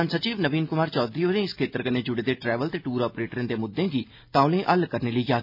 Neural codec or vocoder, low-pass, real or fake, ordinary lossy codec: none; 5.4 kHz; real; MP3, 48 kbps